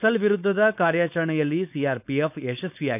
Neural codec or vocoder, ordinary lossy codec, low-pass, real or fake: codec, 16 kHz, 4.8 kbps, FACodec; none; 3.6 kHz; fake